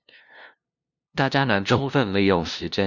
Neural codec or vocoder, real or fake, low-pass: codec, 16 kHz, 0.5 kbps, FunCodec, trained on LibriTTS, 25 frames a second; fake; 7.2 kHz